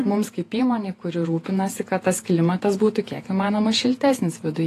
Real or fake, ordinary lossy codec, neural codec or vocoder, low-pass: fake; AAC, 48 kbps; vocoder, 48 kHz, 128 mel bands, Vocos; 14.4 kHz